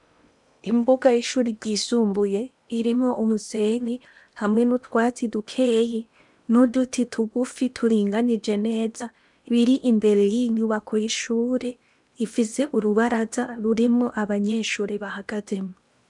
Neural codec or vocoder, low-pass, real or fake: codec, 16 kHz in and 24 kHz out, 0.8 kbps, FocalCodec, streaming, 65536 codes; 10.8 kHz; fake